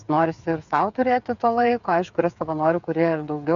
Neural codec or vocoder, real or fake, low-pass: codec, 16 kHz, 8 kbps, FreqCodec, smaller model; fake; 7.2 kHz